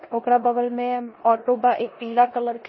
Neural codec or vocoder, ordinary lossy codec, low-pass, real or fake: codec, 16 kHz in and 24 kHz out, 0.9 kbps, LongCat-Audio-Codec, four codebook decoder; MP3, 24 kbps; 7.2 kHz; fake